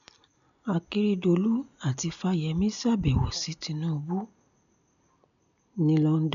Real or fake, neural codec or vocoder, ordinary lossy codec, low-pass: real; none; none; 7.2 kHz